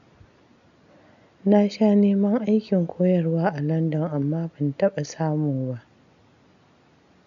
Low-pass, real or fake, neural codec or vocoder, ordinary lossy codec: 7.2 kHz; real; none; none